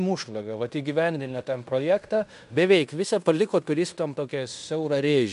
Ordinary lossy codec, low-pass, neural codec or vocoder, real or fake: MP3, 96 kbps; 10.8 kHz; codec, 16 kHz in and 24 kHz out, 0.9 kbps, LongCat-Audio-Codec, fine tuned four codebook decoder; fake